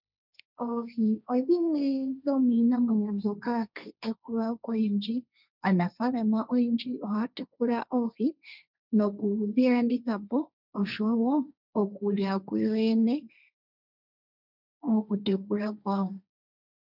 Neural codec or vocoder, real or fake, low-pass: codec, 16 kHz, 1.1 kbps, Voila-Tokenizer; fake; 5.4 kHz